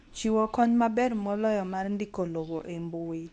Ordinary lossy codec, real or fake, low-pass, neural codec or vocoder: none; fake; 10.8 kHz; codec, 24 kHz, 0.9 kbps, WavTokenizer, medium speech release version 2